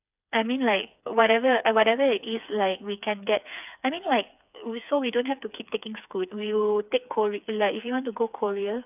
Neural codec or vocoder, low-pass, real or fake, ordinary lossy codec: codec, 16 kHz, 4 kbps, FreqCodec, smaller model; 3.6 kHz; fake; none